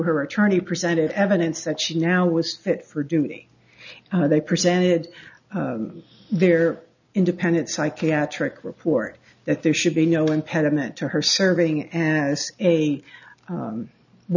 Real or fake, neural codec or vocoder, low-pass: real; none; 7.2 kHz